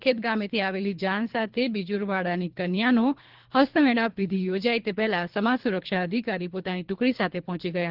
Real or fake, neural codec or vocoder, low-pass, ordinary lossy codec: fake; codec, 24 kHz, 3 kbps, HILCodec; 5.4 kHz; Opus, 16 kbps